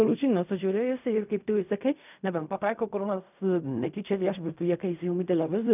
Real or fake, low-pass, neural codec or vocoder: fake; 3.6 kHz; codec, 16 kHz in and 24 kHz out, 0.4 kbps, LongCat-Audio-Codec, fine tuned four codebook decoder